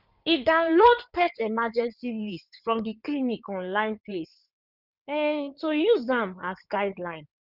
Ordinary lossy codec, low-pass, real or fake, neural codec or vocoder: none; 5.4 kHz; fake; codec, 16 kHz in and 24 kHz out, 2.2 kbps, FireRedTTS-2 codec